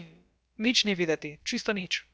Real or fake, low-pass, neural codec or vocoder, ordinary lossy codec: fake; none; codec, 16 kHz, about 1 kbps, DyCAST, with the encoder's durations; none